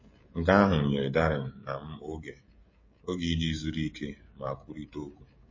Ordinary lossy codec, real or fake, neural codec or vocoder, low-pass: MP3, 32 kbps; fake; codec, 16 kHz, 16 kbps, FreqCodec, smaller model; 7.2 kHz